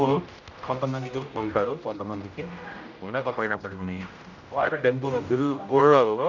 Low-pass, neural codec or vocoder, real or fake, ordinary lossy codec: 7.2 kHz; codec, 16 kHz, 0.5 kbps, X-Codec, HuBERT features, trained on general audio; fake; none